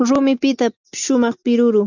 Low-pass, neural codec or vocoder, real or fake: 7.2 kHz; none; real